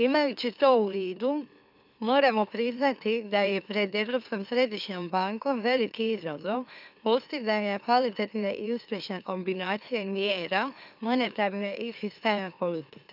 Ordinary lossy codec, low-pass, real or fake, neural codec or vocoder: none; 5.4 kHz; fake; autoencoder, 44.1 kHz, a latent of 192 numbers a frame, MeloTTS